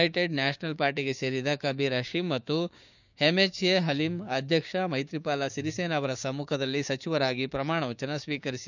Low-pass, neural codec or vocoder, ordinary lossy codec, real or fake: 7.2 kHz; codec, 16 kHz, 6 kbps, DAC; none; fake